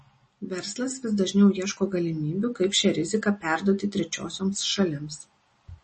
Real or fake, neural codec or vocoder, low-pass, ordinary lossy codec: real; none; 10.8 kHz; MP3, 32 kbps